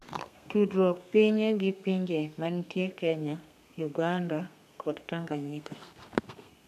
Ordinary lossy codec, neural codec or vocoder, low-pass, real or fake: none; codec, 32 kHz, 1.9 kbps, SNAC; 14.4 kHz; fake